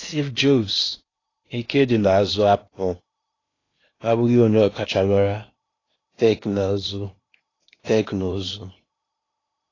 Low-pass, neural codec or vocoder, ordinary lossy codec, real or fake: 7.2 kHz; codec, 16 kHz in and 24 kHz out, 0.8 kbps, FocalCodec, streaming, 65536 codes; AAC, 32 kbps; fake